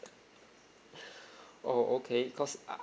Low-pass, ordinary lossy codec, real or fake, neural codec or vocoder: none; none; real; none